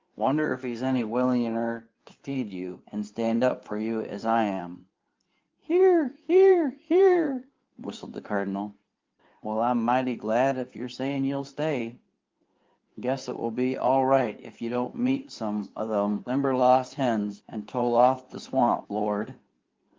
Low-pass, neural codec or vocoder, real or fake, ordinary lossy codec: 7.2 kHz; codec, 16 kHz in and 24 kHz out, 2.2 kbps, FireRedTTS-2 codec; fake; Opus, 32 kbps